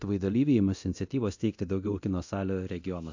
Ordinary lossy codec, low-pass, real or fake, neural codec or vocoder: AAC, 48 kbps; 7.2 kHz; fake; codec, 24 kHz, 0.9 kbps, DualCodec